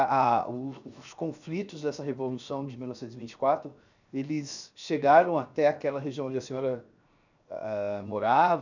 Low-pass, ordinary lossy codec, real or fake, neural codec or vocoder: 7.2 kHz; none; fake; codec, 16 kHz, 0.7 kbps, FocalCodec